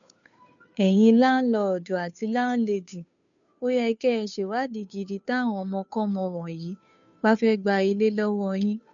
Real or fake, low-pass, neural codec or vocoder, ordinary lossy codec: fake; 7.2 kHz; codec, 16 kHz, 2 kbps, FunCodec, trained on Chinese and English, 25 frames a second; none